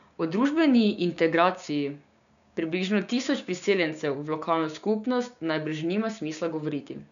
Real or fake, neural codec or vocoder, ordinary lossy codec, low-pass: fake; codec, 16 kHz, 6 kbps, DAC; none; 7.2 kHz